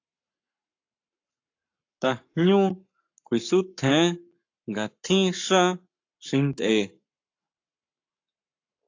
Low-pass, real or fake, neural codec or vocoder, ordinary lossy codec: 7.2 kHz; fake; codec, 44.1 kHz, 7.8 kbps, Pupu-Codec; AAC, 48 kbps